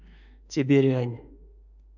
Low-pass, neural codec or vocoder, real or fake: 7.2 kHz; autoencoder, 48 kHz, 32 numbers a frame, DAC-VAE, trained on Japanese speech; fake